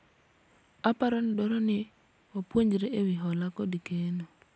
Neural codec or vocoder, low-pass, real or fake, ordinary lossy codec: none; none; real; none